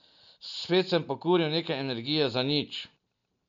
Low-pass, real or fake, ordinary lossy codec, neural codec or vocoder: 7.2 kHz; real; MP3, 64 kbps; none